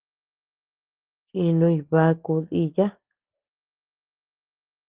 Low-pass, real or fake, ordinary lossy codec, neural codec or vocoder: 3.6 kHz; real; Opus, 16 kbps; none